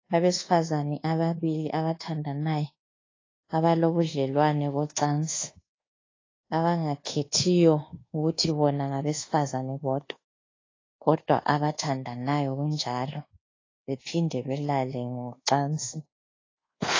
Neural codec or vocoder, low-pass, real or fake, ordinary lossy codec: codec, 24 kHz, 1.2 kbps, DualCodec; 7.2 kHz; fake; AAC, 32 kbps